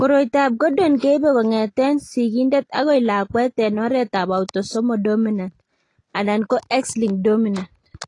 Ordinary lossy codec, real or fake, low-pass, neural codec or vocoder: AAC, 32 kbps; real; 10.8 kHz; none